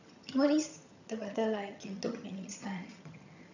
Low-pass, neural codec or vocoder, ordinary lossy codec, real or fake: 7.2 kHz; vocoder, 22.05 kHz, 80 mel bands, HiFi-GAN; none; fake